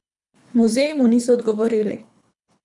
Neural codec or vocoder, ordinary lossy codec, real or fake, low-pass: codec, 24 kHz, 3 kbps, HILCodec; none; fake; 10.8 kHz